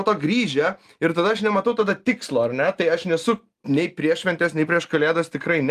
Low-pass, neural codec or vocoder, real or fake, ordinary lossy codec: 14.4 kHz; none; real; Opus, 32 kbps